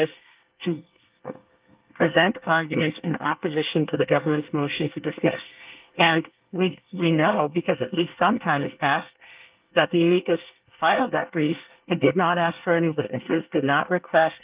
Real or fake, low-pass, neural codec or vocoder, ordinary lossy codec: fake; 3.6 kHz; codec, 24 kHz, 1 kbps, SNAC; Opus, 24 kbps